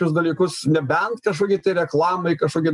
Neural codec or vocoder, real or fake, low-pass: none; real; 10.8 kHz